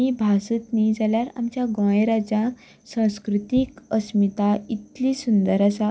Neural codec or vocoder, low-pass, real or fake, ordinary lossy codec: none; none; real; none